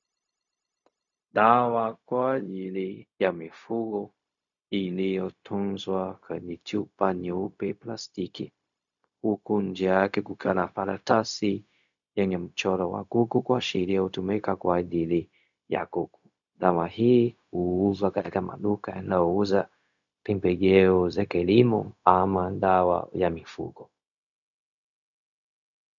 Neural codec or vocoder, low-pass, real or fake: codec, 16 kHz, 0.4 kbps, LongCat-Audio-Codec; 7.2 kHz; fake